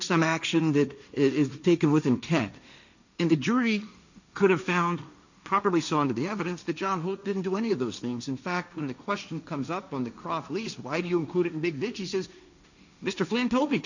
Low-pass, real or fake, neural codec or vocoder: 7.2 kHz; fake; codec, 16 kHz, 1.1 kbps, Voila-Tokenizer